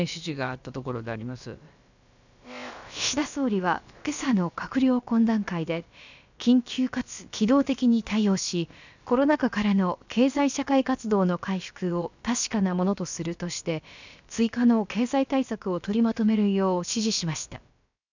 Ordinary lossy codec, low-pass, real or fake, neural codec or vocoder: none; 7.2 kHz; fake; codec, 16 kHz, about 1 kbps, DyCAST, with the encoder's durations